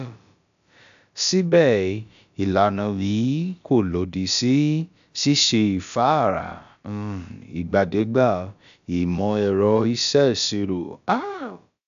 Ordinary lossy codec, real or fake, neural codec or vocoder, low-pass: AAC, 96 kbps; fake; codec, 16 kHz, about 1 kbps, DyCAST, with the encoder's durations; 7.2 kHz